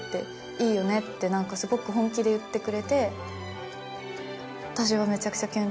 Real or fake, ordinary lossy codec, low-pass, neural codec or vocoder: real; none; none; none